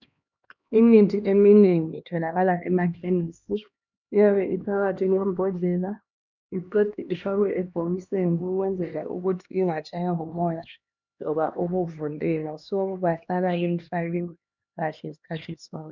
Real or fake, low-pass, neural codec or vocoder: fake; 7.2 kHz; codec, 16 kHz, 2 kbps, X-Codec, HuBERT features, trained on LibriSpeech